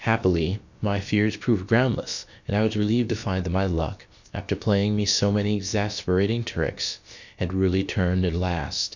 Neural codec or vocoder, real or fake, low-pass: codec, 16 kHz, about 1 kbps, DyCAST, with the encoder's durations; fake; 7.2 kHz